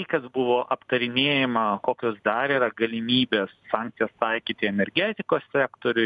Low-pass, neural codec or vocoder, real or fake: 9.9 kHz; none; real